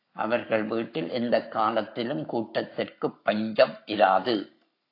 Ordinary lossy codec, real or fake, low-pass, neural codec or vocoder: AAC, 32 kbps; fake; 5.4 kHz; codec, 44.1 kHz, 7.8 kbps, Pupu-Codec